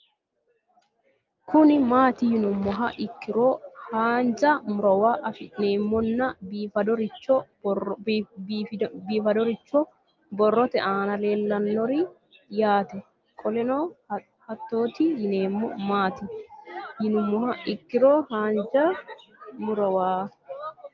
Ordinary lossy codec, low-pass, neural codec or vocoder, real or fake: Opus, 24 kbps; 7.2 kHz; none; real